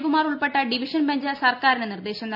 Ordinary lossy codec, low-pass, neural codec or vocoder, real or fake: none; 5.4 kHz; none; real